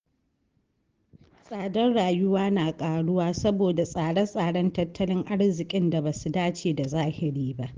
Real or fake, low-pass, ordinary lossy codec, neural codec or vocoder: real; 7.2 kHz; Opus, 16 kbps; none